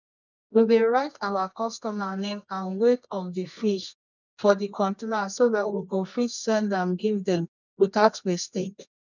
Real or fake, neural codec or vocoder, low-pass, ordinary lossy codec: fake; codec, 24 kHz, 0.9 kbps, WavTokenizer, medium music audio release; 7.2 kHz; none